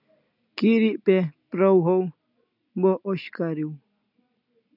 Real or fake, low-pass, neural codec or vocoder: real; 5.4 kHz; none